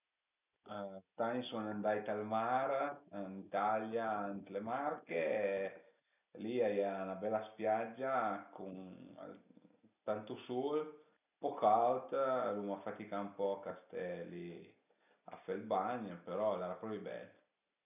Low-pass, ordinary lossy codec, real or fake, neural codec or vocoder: 3.6 kHz; none; real; none